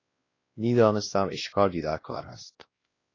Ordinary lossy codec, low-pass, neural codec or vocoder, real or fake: AAC, 32 kbps; 7.2 kHz; codec, 16 kHz, 1 kbps, X-Codec, WavLM features, trained on Multilingual LibriSpeech; fake